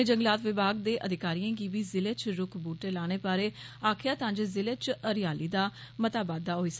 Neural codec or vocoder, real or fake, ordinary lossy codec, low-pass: none; real; none; none